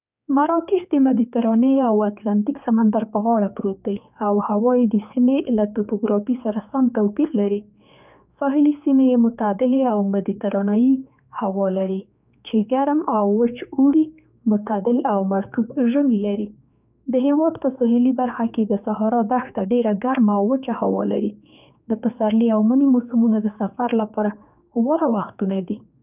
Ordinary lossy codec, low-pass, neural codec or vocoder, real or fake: none; 3.6 kHz; codec, 16 kHz, 4 kbps, X-Codec, HuBERT features, trained on general audio; fake